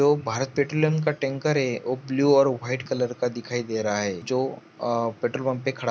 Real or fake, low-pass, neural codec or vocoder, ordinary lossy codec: real; none; none; none